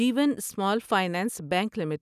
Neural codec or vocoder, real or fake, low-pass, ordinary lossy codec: none; real; 14.4 kHz; none